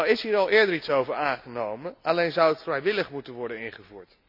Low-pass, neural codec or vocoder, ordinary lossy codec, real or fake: 5.4 kHz; none; none; real